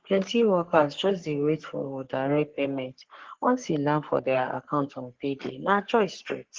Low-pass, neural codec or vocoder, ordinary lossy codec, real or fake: 7.2 kHz; codec, 44.1 kHz, 3.4 kbps, Pupu-Codec; Opus, 16 kbps; fake